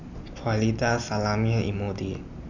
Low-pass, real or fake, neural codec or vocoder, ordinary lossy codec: 7.2 kHz; real; none; none